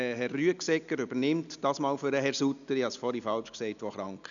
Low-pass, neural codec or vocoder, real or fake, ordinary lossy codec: 7.2 kHz; none; real; none